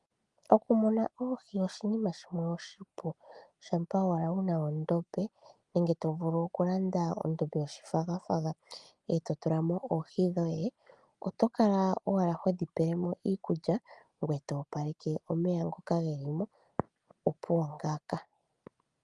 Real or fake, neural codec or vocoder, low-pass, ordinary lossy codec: real; none; 10.8 kHz; Opus, 32 kbps